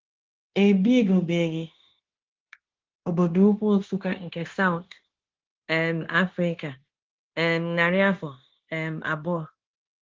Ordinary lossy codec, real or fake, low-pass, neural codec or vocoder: Opus, 16 kbps; fake; 7.2 kHz; codec, 16 kHz, 0.9 kbps, LongCat-Audio-Codec